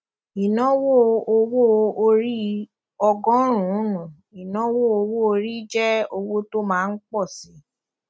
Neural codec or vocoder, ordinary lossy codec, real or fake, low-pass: none; none; real; none